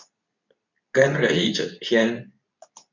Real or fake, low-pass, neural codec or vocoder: fake; 7.2 kHz; codec, 24 kHz, 0.9 kbps, WavTokenizer, medium speech release version 2